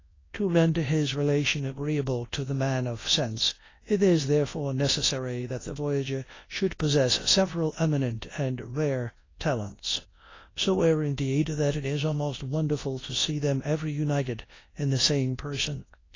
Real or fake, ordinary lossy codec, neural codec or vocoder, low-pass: fake; AAC, 32 kbps; codec, 24 kHz, 0.9 kbps, WavTokenizer, large speech release; 7.2 kHz